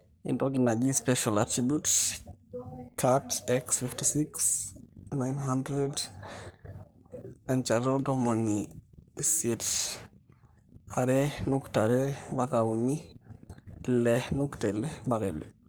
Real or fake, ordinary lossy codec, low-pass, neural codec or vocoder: fake; none; none; codec, 44.1 kHz, 3.4 kbps, Pupu-Codec